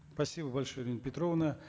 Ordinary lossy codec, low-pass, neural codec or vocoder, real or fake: none; none; none; real